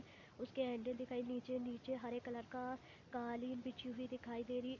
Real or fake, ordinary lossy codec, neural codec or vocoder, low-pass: real; none; none; 7.2 kHz